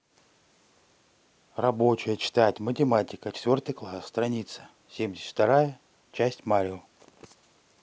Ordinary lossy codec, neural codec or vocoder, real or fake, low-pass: none; none; real; none